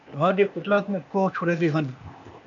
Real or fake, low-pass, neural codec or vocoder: fake; 7.2 kHz; codec, 16 kHz, 0.8 kbps, ZipCodec